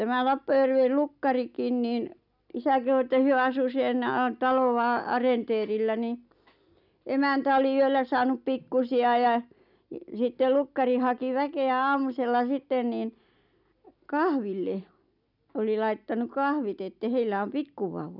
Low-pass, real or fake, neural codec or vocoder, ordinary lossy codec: 5.4 kHz; real; none; none